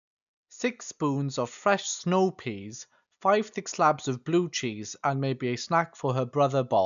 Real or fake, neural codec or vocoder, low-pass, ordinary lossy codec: real; none; 7.2 kHz; none